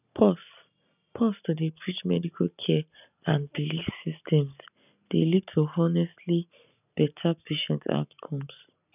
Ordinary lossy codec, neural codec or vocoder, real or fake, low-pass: none; none; real; 3.6 kHz